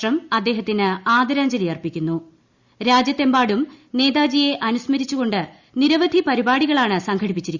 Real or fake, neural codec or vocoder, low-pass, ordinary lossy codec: real; none; 7.2 kHz; Opus, 64 kbps